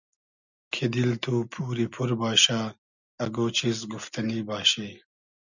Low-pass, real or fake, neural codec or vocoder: 7.2 kHz; real; none